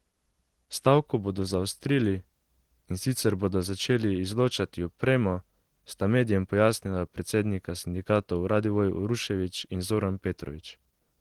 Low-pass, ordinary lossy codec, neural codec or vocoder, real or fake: 19.8 kHz; Opus, 16 kbps; none; real